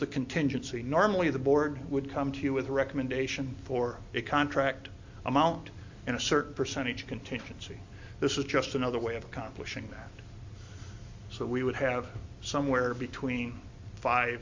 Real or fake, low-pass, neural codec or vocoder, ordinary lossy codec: real; 7.2 kHz; none; MP3, 48 kbps